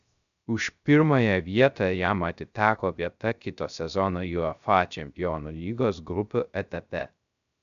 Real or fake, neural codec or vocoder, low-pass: fake; codec, 16 kHz, 0.3 kbps, FocalCodec; 7.2 kHz